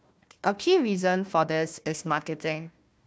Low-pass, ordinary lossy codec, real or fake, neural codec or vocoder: none; none; fake; codec, 16 kHz, 1 kbps, FunCodec, trained on Chinese and English, 50 frames a second